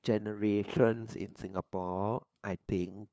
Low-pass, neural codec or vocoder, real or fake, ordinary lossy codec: none; codec, 16 kHz, 2 kbps, FunCodec, trained on LibriTTS, 25 frames a second; fake; none